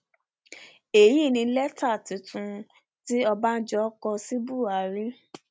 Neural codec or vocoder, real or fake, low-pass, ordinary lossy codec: none; real; none; none